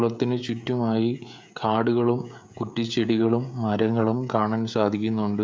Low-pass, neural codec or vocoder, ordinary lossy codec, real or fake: none; codec, 16 kHz, 16 kbps, FreqCodec, smaller model; none; fake